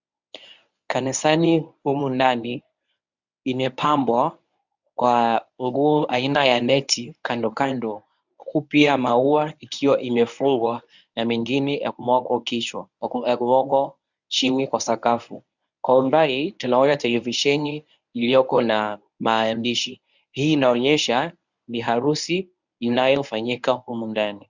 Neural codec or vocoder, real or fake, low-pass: codec, 24 kHz, 0.9 kbps, WavTokenizer, medium speech release version 1; fake; 7.2 kHz